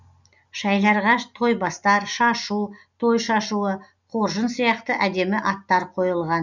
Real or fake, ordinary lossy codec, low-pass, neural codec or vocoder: real; none; 7.2 kHz; none